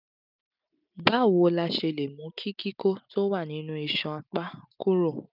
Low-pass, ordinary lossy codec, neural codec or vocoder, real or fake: 5.4 kHz; none; none; real